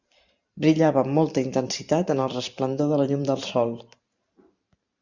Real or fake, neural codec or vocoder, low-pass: fake; vocoder, 44.1 kHz, 128 mel bands every 512 samples, BigVGAN v2; 7.2 kHz